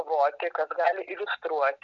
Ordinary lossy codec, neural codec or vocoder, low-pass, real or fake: MP3, 48 kbps; none; 7.2 kHz; real